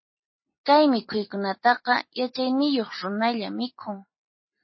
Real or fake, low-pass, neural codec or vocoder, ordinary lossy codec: real; 7.2 kHz; none; MP3, 24 kbps